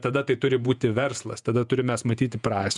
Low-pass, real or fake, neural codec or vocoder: 10.8 kHz; fake; vocoder, 48 kHz, 128 mel bands, Vocos